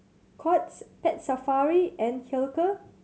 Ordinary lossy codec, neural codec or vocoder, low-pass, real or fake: none; none; none; real